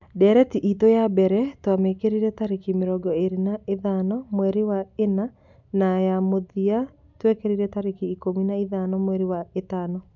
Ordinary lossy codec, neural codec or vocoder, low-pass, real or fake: none; none; 7.2 kHz; real